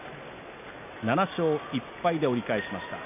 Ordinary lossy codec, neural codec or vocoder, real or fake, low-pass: none; none; real; 3.6 kHz